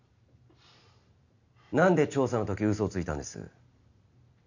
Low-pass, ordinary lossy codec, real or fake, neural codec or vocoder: 7.2 kHz; none; real; none